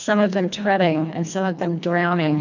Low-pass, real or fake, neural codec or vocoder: 7.2 kHz; fake; codec, 24 kHz, 1.5 kbps, HILCodec